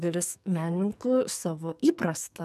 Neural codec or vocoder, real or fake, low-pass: codec, 44.1 kHz, 2.6 kbps, SNAC; fake; 14.4 kHz